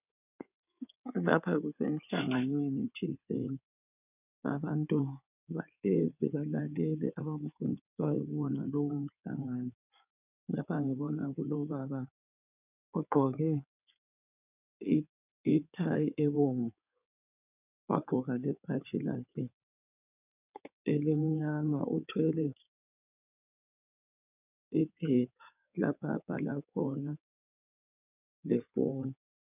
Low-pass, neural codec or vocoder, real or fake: 3.6 kHz; codec, 16 kHz in and 24 kHz out, 2.2 kbps, FireRedTTS-2 codec; fake